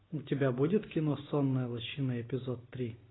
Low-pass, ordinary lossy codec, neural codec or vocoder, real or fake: 7.2 kHz; AAC, 16 kbps; none; real